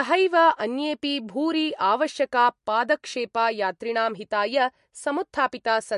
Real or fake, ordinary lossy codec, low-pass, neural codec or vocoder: real; MP3, 48 kbps; 14.4 kHz; none